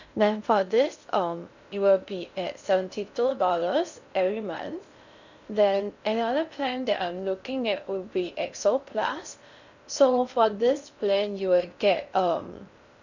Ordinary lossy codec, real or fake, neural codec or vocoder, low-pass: none; fake; codec, 16 kHz in and 24 kHz out, 0.6 kbps, FocalCodec, streaming, 2048 codes; 7.2 kHz